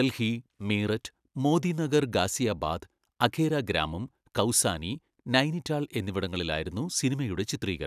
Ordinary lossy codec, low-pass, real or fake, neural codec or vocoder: none; 14.4 kHz; real; none